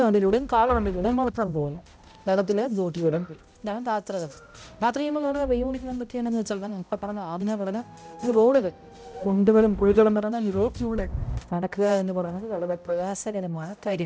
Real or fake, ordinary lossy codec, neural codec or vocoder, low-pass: fake; none; codec, 16 kHz, 0.5 kbps, X-Codec, HuBERT features, trained on balanced general audio; none